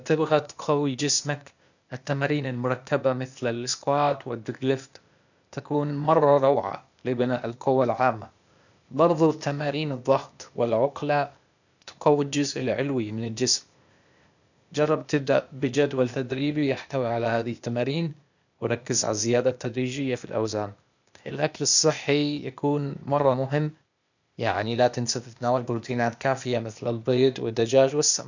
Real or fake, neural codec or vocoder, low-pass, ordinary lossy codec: fake; codec, 16 kHz, 0.8 kbps, ZipCodec; 7.2 kHz; none